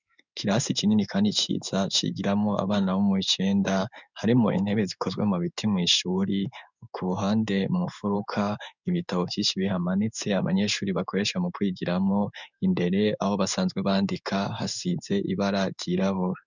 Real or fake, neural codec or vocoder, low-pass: fake; codec, 16 kHz in and 24 kHz out, 1 kbps, XY-Tokenizer; 7.2 kHz